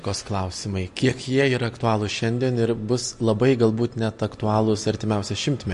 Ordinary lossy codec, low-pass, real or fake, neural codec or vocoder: MP3, 48 kbps; 14.4 kHz; real; none